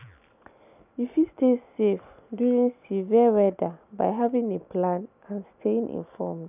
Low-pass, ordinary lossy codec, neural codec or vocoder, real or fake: 3.6 kHz; none; none; real